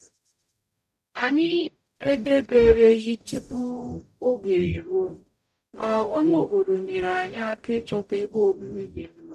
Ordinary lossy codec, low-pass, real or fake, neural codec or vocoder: none; 14.4 kHz; fake; codec, 44.1 kHz, 0.9 kbps, DAC